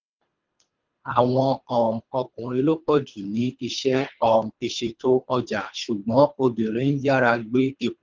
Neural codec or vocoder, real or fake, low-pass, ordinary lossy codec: codec, 24 kHz, 3 kbps, HILCodec; fake; 7.2 kHz; Opus, 24 kbps